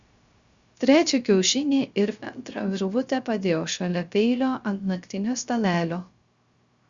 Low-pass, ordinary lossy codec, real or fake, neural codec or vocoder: 7.2 kHz; Opus, 64 kbps; fake; codec, 16 kHz, 0.3 kbps, FocalCodec